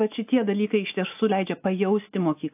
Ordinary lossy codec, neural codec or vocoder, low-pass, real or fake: AAC, 32 kbps; none; 3.6 kHz; real